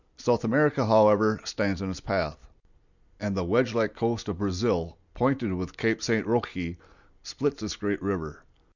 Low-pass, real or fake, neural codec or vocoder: 7.2 kHz; real; none